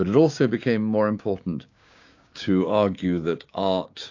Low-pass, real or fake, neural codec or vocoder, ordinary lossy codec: 7.2 kHz; fake; codec, 16 kHz, 6 kbps, DAC; AAC, 48 kbps